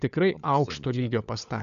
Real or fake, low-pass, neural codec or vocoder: fake; 7.2 kHz; codec, 16 kHz, 16 kbps, FunCodec, trained on LibriTTS, 50 frames a second